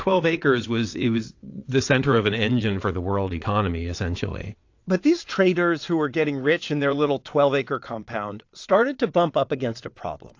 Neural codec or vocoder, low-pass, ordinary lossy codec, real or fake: vocoder, 22.05 kHz, 80 mel bands, WaveNeXt; 7.2 kHz; AAC, 48 kbps; fake